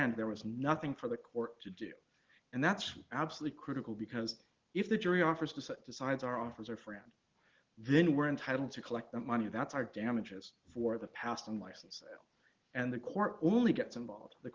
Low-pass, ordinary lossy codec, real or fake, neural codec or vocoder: 7.2 kHz; Opus, 16 kbps; real; none